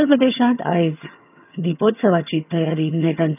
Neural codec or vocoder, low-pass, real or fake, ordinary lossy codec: vocoder, 22.05 kHz, 80 mel bands, HiFi-GAN; 3.6 kHz; fake; none